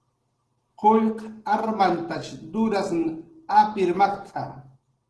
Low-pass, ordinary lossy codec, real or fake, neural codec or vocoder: 10.8 kHz; Opus, 16 kbps; real; none